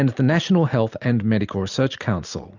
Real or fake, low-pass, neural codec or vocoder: real; 7.2 kHz; none